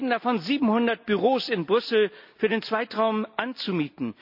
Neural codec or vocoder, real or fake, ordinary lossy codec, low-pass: none; real; none; 5.4 kHz